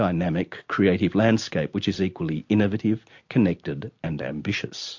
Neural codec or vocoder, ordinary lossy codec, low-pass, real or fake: none; MP3, 48 kbps; 7.2 kHz; real